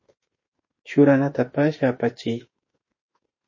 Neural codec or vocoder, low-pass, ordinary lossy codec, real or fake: autoencoder, 48 kHz, 32 numbers a frame, DAC-VAE, trained on Japanese speech; 7.2 kHz; MP3, 32 kbps; fake